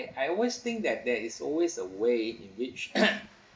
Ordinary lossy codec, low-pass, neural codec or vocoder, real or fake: none; none; none; real